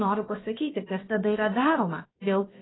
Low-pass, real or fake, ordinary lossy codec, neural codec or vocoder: 7.2 kHz; fake; AAC, 16 kbps; codec, 16 kHz, about 1 kbps, DyCAST, with the encoder's durations